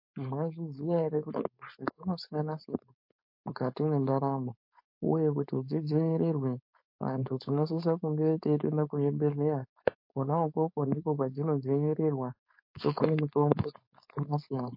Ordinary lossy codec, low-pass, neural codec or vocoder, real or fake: MP3, 32 kbps; 5.4 kHz; codec, 16 kHz, 4.8 kbps, FACodec; fake